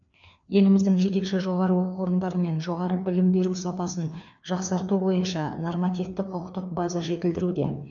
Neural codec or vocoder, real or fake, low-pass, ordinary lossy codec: codec, 16 kHz, 2 kbps, FreqCodec, larger model; fake; 7.2 kHz; none